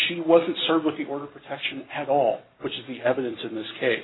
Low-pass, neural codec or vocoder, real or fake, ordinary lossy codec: 7.2 kHz; none; real; AAC, 16 kbps